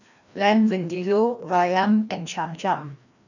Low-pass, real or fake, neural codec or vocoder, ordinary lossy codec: 7.2 kHz; fake; codec, 16 kHz, 1 kbps, FreqCodec, larger model; none